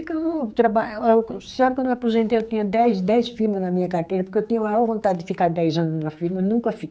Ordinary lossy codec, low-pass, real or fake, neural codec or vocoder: none; none; fake; codec, 16 kHz, 4 kbps, X-Codec, HuBERT features, trained on general audio